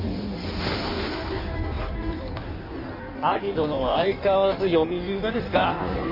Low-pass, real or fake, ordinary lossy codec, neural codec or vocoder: 5.4 kHz; fake; MP3, 32 kbps; codec, 16 kHz in and 24 kHz out, 1.1 kbps, FireRedTTS-2 codec